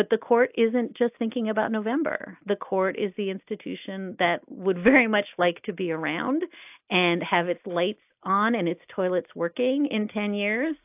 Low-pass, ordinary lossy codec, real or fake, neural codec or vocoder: 3.6 kHz; AAC, 32 kbps; real; none